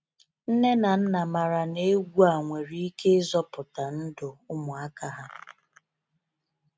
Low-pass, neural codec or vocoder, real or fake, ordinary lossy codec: none; none; real; none